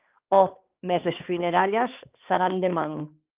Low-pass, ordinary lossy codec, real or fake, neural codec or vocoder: 3.6 kHz; Opus, 24 kbps; fake; codec, 16 kHz, 2 kbps, X-Codec, HuBERT features, trained on balanced general audio